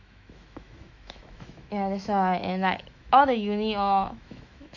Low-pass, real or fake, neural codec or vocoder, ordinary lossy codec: 7.2 kHz; fake; autoencoder, 48 kHz, 128 numbers a frame, DAC-VAE, trained on Japanese speech; none